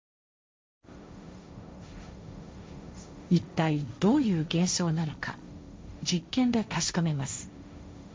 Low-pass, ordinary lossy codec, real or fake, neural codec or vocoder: none; none; fake; codec, 16 kHz, 1.1 kbps, Voila-Tokenizer